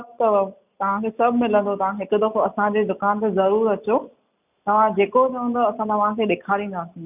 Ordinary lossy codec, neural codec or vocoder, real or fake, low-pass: none; none; real; 3.6 kHz